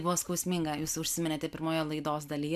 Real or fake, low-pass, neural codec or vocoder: real; 14.4 kHz; none